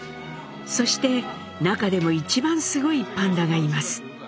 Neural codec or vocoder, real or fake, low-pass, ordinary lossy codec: none; real; none; none